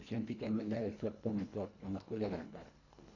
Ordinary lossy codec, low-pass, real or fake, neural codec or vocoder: none; 7.2 kHz; fake; codec, 24 kHz, 1.5 kbps, HILCodec